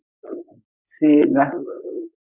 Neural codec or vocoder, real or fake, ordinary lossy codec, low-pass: codec, 16 kHz, 4.8 kbps, FACodec; fake; Opus, 64 kbps; 3.6 kHz